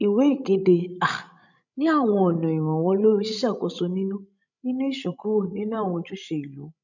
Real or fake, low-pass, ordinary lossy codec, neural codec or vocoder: fake; 7.2 kHz; none; codec, 16 kHz, 16 kbps, FreqCodec, larger model